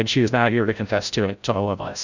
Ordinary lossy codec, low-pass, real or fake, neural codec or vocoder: Opus, 64 kbps; 7.2 kHz; fake; codec, 16 kHz, 0.5 kbps, FreqCodec, larger model